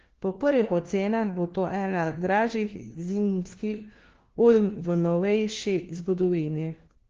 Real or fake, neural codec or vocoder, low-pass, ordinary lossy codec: fake; codec, 16 kHz, 1 kbps, FunCodec, trained on LibriTTS, 50 frames a second; 7.2 kHz; Opus, 16 kbps